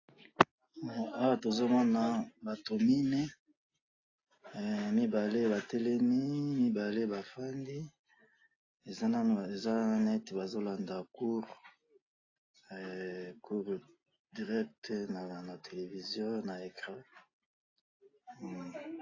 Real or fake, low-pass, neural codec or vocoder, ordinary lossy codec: real; 7.2 kHz; none; AAC, 32 kbps